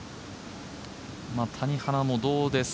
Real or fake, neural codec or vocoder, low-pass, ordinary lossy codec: real; none; none; none